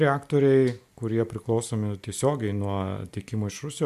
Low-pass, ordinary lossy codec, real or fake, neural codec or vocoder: 14.4 kHz; MP3, 96 kbps; real; none